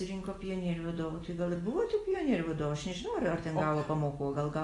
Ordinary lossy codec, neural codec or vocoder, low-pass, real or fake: AAC, 32 kbps; none; 10.8 kHz; real